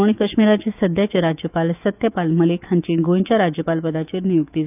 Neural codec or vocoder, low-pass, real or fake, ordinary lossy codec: autoencoder, 48 kHz, 128 numbers a frame, DAC-VAE, trained on Japanese speech; 3.6 kHz; fake; none